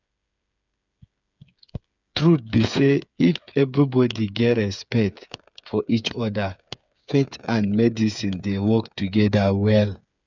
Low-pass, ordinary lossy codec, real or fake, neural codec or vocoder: 7.2 kHz; none; fake; codec, 16 kHz, 8 kbps, FreqCodec, smaller model